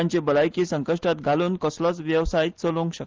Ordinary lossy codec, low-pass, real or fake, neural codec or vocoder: Opus, 16 kbps; 7.2 kHz; real; none